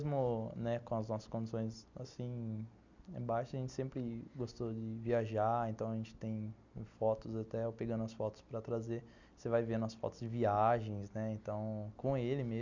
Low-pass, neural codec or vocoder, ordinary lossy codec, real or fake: 7.2 kHz; none; none; real